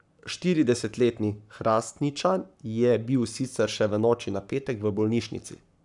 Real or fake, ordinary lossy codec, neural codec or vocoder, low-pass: fake; none; codec, 44.1 kHz, 7.8 kbps, Pupu-Codec; 10.8 kHz